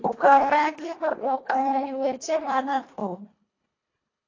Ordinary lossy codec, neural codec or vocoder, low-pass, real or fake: AAC, 32 kbps; codec, 24 kHz, 1.5 kbps, HILCodec; 7.2 kHz; fake